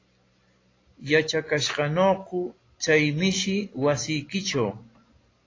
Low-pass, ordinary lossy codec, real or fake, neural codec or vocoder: 7.2 kHz; AAC, 32 kbps; real; none